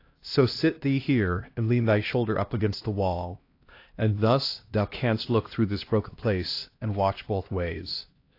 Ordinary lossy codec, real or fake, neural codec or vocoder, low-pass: AAC, 32 kbps; fake; codec, 16 kHz, 1 kbps, X-Codec, HuBERT features, trained on LibriSpeech; 5.4 kHz